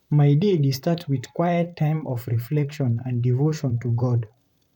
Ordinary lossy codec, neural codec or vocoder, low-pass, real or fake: none; vocoder, 44.1 kHz, 128 mel bands, Pupu-Vocoder; 19.8 kHz; fake